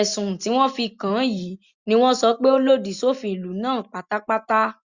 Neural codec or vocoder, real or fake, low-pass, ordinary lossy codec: none; real; 7.2 kHz; Opus, 64 kbps